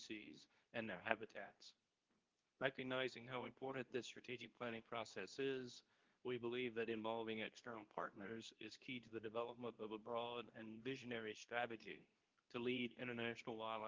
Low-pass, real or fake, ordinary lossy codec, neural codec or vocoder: 7.2 kHz; fake; Opus, 32 kbps; codec, 24 kHz, 0.9 kbps, WavTokenizer, medium speech release version 2